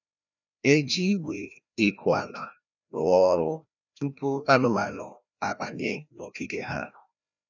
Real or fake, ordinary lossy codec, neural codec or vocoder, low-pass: fake; none; codec, 16 kHz, 1 kbps, FreqCodec, larger model; 7.2 kHz